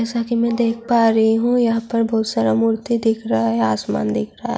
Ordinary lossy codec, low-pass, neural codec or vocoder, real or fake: none; none; none; real